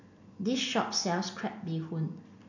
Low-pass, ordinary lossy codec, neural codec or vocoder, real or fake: 7.2 kHz; none; none; real